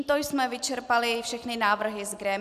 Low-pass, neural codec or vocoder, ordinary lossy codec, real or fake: 14.4 kHz; none; AAC, 96 kbps; real